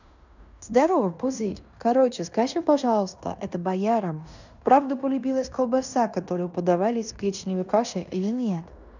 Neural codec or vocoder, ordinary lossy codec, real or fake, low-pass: codec, 16 kHz in and 24 kHz out, 0.9 kbps, LongCat-Audio-Codec, fine tuned four codebook decoder; none; fake; 7.2 kHz